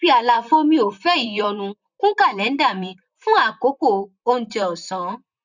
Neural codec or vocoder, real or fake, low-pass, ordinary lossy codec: vocoder, 44.1 kHz, 128 mel bands, Pupu-Vocoder; fake; 7.2 kHz; none